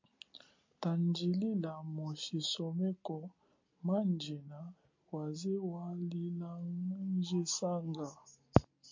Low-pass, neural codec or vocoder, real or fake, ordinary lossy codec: 7.2 kHz; none; real; MP3, 48 kbps